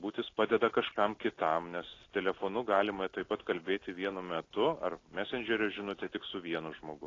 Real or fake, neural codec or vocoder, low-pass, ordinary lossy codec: real; none; 7.2 kHz; AAC, 32 kbps